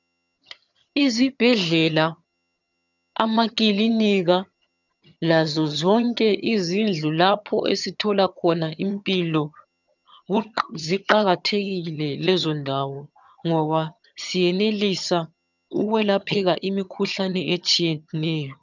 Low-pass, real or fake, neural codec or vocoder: 7.2 kHz; fake; vocoder, 22.05 kHz, 80 mel bands, HiFi-GAN